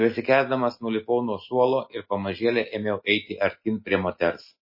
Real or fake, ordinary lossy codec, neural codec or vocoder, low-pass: real; MP3, 24 kbps; none; 5.4 kHz